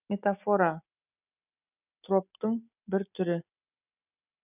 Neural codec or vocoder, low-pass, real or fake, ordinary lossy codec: none; 3.6 kHz; real; none